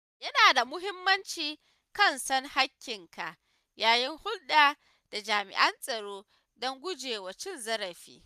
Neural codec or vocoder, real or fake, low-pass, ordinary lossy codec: none; real; 14.4 kHz; none